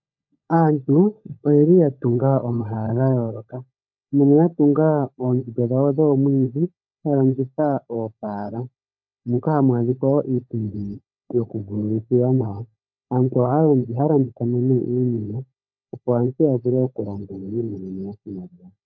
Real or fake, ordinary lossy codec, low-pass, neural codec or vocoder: fake; AAC, 48 kbps; 7.2 kHz; codec, 16 kHz, 16 kbps, FunCodec, trained on LibriTTS, 50 frames a second